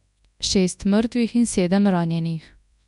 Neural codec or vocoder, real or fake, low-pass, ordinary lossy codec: codec, 24 kHz, 0.9 kbps, WavTokenizer, large speech release; fake; 10.8 kHz; none